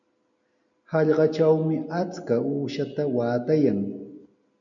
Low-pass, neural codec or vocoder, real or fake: 7.2 kHz; none; real